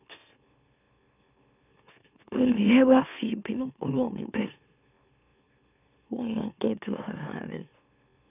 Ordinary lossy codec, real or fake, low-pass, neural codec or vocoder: none; fake; 3.6 kHz; autoencoder, 44.1 kHz, a latent of 192 numbers a frame, MeloTTS